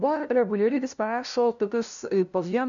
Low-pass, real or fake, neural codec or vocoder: 7.2 kHz; fake; codec, 16 kHz, 0.5 kbps, FunCodec, trained on LibriTTS, 25 frames a second